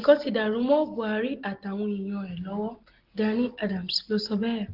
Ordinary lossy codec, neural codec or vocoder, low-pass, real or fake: Opus, 16 kbps; none; 5.4 kHz; real